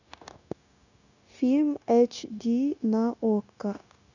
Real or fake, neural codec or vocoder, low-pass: fake; codec, 16 kHz, 0.9 kbps, LongCat-Audio-Codec; 7.2 kHz